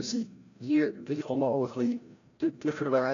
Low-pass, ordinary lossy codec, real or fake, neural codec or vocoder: 7.2 kHz; AAC, 48 kbps; fake; codec, 16 kHz, 0.5 kbps, FreqCodec, larger model